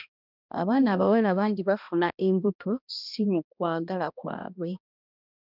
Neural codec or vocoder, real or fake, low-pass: codec, 16 kHz, 1 kbps, X-Codec, HuBERT features, trained on balanced general audio; fake; 5.4 kHz